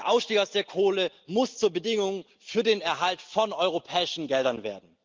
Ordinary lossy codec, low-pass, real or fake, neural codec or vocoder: Opus, 32 kbps; 7.2 kHz; real; none